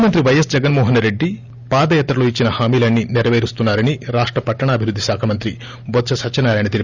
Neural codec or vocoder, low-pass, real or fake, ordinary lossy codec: none; 7.2 kHz; real; Opus, 64 kbps